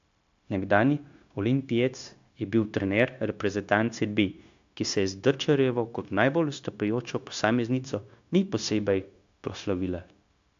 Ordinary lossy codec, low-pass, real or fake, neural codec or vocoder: AAC, 64 kbps; 7.2 kHz; fake; codec, 16 kHz, 0.9 kbps, LongCat-Audio-Codec